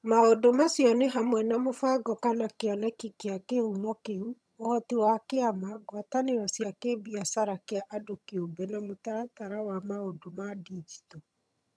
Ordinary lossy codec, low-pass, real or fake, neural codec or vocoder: none; none; fake; vocoder, 22.05 kHz, 80 mel bands, HiFi-GAN